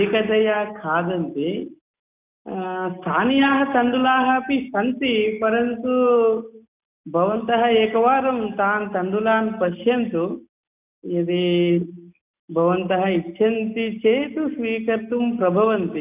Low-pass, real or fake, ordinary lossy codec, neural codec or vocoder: 3.6 kHz; real; none; none